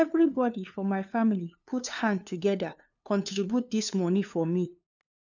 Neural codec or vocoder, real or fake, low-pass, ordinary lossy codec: codec, 16 kHz, 2 kbps, FunCodec, trained on LibriTTS, 25 frames a second; fake; 7.2 kHz; none